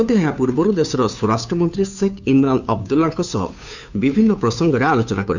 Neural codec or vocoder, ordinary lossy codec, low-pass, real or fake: codec, 16 kHz, 2 kbps, FunCodec, trained on Chinese and English, 25 frames a second; none; 7.2 kHz; fake